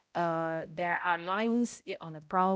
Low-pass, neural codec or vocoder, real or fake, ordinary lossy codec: none; codec, 16 kHz, 0.5 kbps, X-Codec, HuBERT features, trained on balanced general audio; fake; none